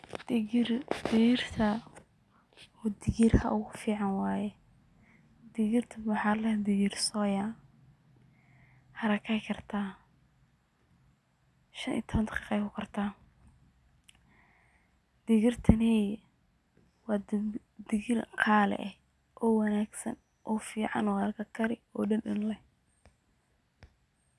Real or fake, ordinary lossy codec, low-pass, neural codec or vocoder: real; none; none; none